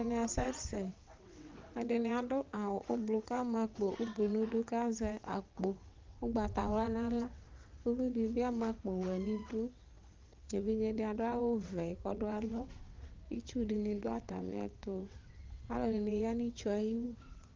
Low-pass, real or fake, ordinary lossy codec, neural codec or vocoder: 7.2 kHz; fake; Opus, 32 kbps; vocoder, 22.05 kHz, 80 mel bands, WaveNeXt